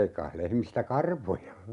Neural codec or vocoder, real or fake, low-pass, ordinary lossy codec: none; real; 10.8 kHz; none